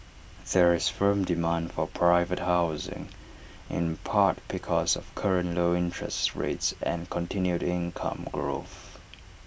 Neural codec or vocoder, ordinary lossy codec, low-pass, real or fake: none; none; none; real